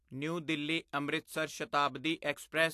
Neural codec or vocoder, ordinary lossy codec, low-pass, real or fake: none; AAC, 64 kbps; 14.4 kHz; real